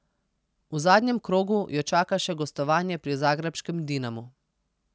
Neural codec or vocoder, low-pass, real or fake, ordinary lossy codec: none; none; real; none